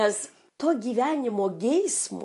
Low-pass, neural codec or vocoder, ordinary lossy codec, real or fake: 10.8 kHz; vocoder, 24 kHz, 100 mel bands, Vocos; MP3, 64 kbps; fake